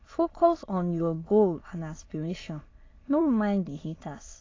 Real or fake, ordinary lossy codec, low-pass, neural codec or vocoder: fake; AAC, 32 kbps; 7.2 kHz; autoencoder, 22.05 kHz, a latent of 192 numbers a frame, VITS, trained on many speakers